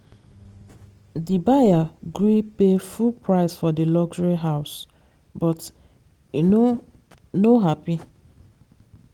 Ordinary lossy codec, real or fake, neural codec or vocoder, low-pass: Opus, 24 kbps; real; none; 19.8 kHz